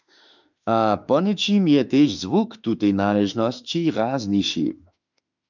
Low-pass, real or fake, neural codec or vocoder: 7.2 kHz; fake; autoencoder, 48 kHz, 32 numbers a frame, DAC-VAE, trained on Japanese speech